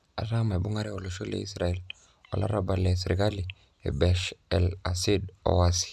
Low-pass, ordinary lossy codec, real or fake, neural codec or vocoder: none; none; real; none